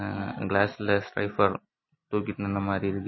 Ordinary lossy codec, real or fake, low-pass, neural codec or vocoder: MP3, 24 kbps; real; 7.2 kHz; none